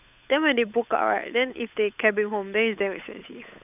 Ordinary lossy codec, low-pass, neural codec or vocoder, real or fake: none; 3.6 kHz; codec, 16 kHz, 8 kbps, FunCodec, trained on Chinese and English, 25 frames a second; fake